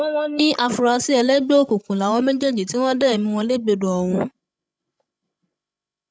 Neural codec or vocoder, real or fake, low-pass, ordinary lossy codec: codec, 16 kHz, 16 kbps, FreqCodec, larger model; fake; none; none